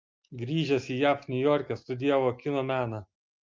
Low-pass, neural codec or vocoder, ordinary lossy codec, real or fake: 7.2 kHz; none; Opus, 24 kbps; real